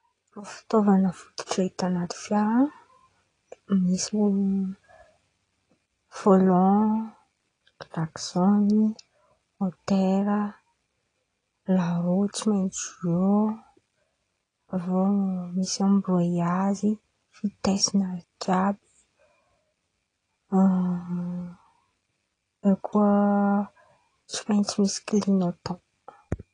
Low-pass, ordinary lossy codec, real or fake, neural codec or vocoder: 9.9 kHz; AAC, 32 kbps; real; none